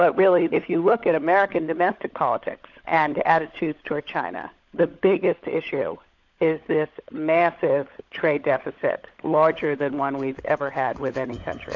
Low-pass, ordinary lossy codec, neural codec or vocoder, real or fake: 7.2 kHz; AAC, 48 kbps; codec, 16 kHz, 16 kbps, FunCodec, trained on LibriTTS, 50 frames a second; fake